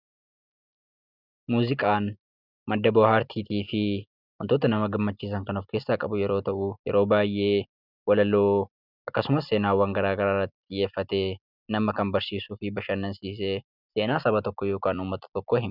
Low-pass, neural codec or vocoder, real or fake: 5.4 kHz; none; real